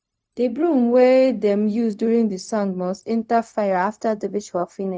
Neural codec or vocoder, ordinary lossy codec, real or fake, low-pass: codec, 16 kHz, 0.4 kbps, LongCat-Audio-Codec; none; fake; none